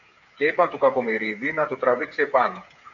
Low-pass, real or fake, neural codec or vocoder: 7.2 kHz; fake; codec, 16 kHz, 8 kbps, FreqCodec, smaller model